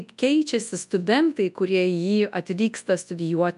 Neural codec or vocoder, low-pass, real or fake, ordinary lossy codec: codec, 24 kHz, 0.9 kbps, WavTokenizer, large speech release; 10.8 kHz; fake; MP3, 96 kbps